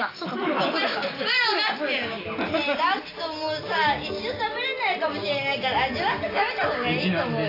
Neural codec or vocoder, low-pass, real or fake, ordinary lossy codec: none; 5.4 kHz; real; AAC, 24 kbps